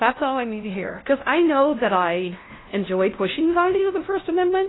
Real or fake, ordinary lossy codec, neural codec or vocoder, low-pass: fake; AAC, 16 kbps; codec, 16 kHz, 0.5 kbps, FunCodec, trained on LibriTTS, 25 frames a second; 7.2 kHz